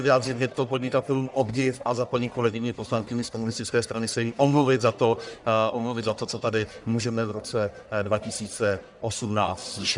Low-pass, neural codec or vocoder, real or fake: 10.8 kHz; codec, 44.1 kHz, 1.7 kbps, Pupu-Codec; fake